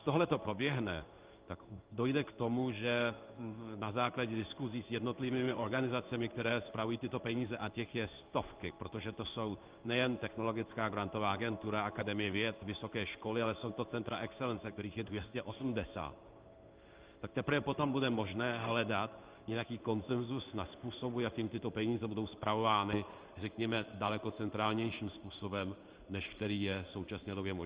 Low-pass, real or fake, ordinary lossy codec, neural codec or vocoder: 3.6 kHz; fake; Opus, 24 kbps; codec, 16 kHz in and 24 kHz out, 1 kbps, XY-Tokenizer